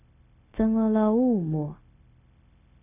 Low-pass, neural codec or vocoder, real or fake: 3.6 kHz; codec, 16 kHz, 0.4 kbps, LongCat-Audio-Codec; fake